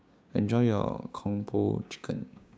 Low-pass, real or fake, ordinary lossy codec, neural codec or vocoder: none; fake; none; codec, 16 kHz, 6 kbps, DAC